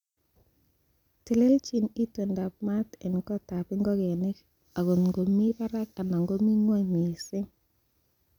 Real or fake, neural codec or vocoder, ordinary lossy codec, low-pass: real; none; none; 19.8 kHz